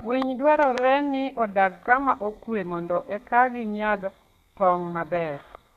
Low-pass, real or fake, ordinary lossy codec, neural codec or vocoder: 14.4 kHz; fake; none; codec, 32 kHz, 1.9 kbps, SNAC